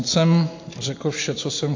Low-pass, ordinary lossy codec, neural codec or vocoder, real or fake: 7.2 kHz; AAC, 48 kbps; none; real